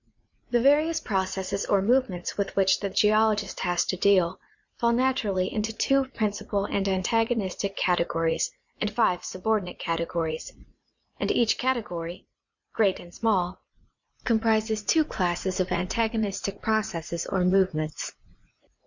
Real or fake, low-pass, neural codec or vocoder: fake; 7.2 kHz; vocoder, 44.1 kHz, 80 mel bands, Vocos